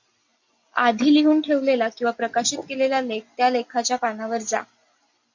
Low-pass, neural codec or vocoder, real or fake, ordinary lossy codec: 7.2 kHz; none; real; MP3, 64 kbps